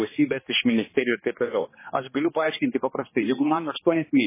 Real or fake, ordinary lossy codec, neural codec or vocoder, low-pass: fake; MP3, 16 kbps; codec, 16 kHz, 2 kbps, X-Codec, HuBERT features, trained on general audio; 3.6 kHz